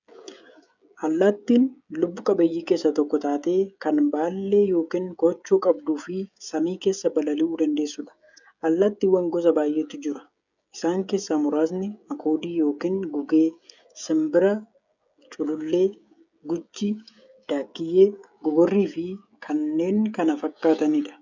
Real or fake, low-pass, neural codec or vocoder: fake; 7.2 kHz; codec, 16 kHz, 16 kbps, FreqCodec, smaller model